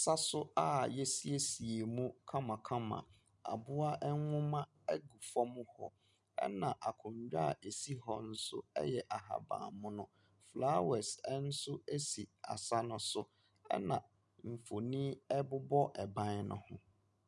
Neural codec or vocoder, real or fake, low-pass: none; real; 10.8 kHz